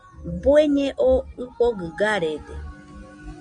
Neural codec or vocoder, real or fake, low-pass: none; real; 9.9 kHz